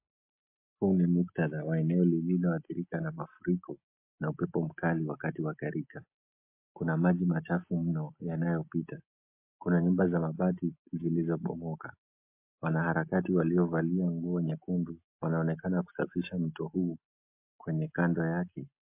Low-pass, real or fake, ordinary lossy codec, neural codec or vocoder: 3.6 kHz; real; MP3, 32 kbps; none